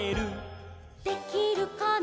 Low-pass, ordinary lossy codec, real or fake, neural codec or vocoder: none; none; real; none